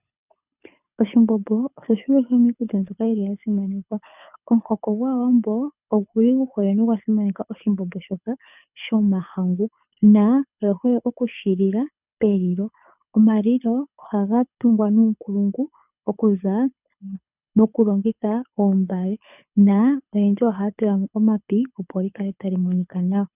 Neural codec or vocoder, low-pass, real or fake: codec, 24 kHz, 6 kbps, HILCodec; 3.6 kHz; fake